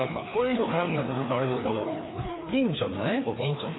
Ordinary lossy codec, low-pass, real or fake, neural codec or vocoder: AAC, 16 kbps; 7.2 kHz; fake; codec, 16 kHz, 2 kbps, FreqCodec, larger model